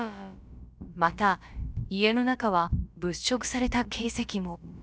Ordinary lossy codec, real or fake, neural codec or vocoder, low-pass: none; fake; codec, 16 kHz, about 1 kbps, DyCAST, with the encoder's durations; none